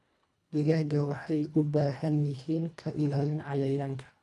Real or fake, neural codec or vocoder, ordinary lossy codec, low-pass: fake; codec, 24 kHz, 1.5 kbps, HILCodec; none; none